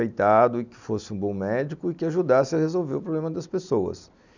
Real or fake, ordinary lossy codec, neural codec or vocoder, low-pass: real; none; none; 7.2 kHz